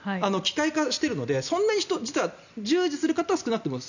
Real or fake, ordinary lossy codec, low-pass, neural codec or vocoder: real; none; 7.2 kHz; none